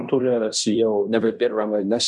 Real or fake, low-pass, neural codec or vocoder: fake; 10.8 kHz; codec, 16 kHz in and 24 kHz out, 0.9 kbps, LongCat-Audio-Codec, fine tuned four codebook decoder